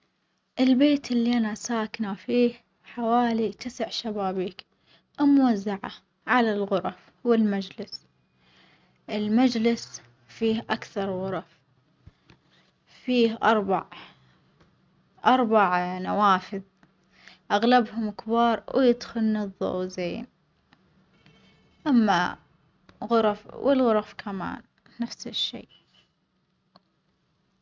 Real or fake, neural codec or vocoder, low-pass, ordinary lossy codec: real; none; none; none